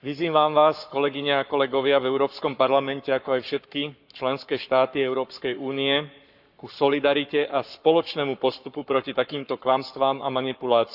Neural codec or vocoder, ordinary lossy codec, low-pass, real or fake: codec, 44.1 kHz, 7.8 kbps, Pupu-Codec; none; 5.4 kHz; fake